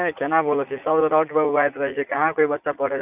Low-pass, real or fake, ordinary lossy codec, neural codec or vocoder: 3.6 kHz; fake; none; vocoder, 44.1 kHz, 80 mel bands, Vocos